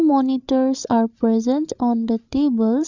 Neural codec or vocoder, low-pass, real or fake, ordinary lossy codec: codec, 16 kHz, 8 kbps, FreqCodec, larger model; 7.2 kHz; fake; none